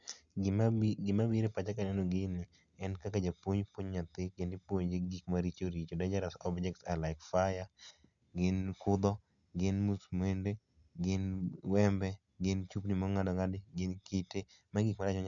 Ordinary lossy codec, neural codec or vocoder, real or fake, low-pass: none; none; real; 7.2 kHz